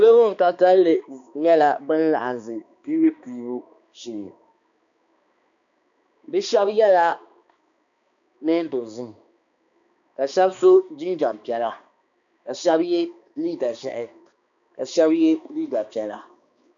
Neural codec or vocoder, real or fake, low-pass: codec, 16 kHz, 2 kbps, X-Codec, HuBERT features, trained on balanced general audio; fake; 7.2 kHz